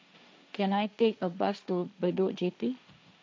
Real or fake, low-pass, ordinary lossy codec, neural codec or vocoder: fake; none; none; codec, 16 kHz, 1.1 kbps, Voila-Tokenizer